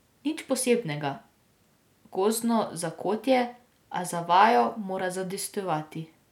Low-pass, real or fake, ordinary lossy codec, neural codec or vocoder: 19.8 kHz; real; none; none